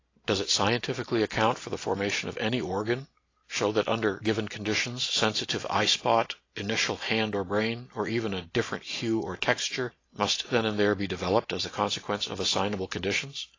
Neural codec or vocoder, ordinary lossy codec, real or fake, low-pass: none; AAC, 32 kbps; real; 7.2 kHz